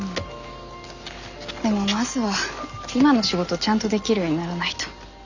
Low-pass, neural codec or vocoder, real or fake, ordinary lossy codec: 7.2 kHz; none; real; none